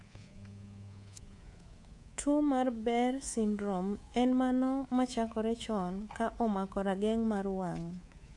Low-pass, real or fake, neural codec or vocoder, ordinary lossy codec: 10.8 kHz; fake; codec, 24 kHz, 3.1 kbps, DualCodec; AAC, 48 kbps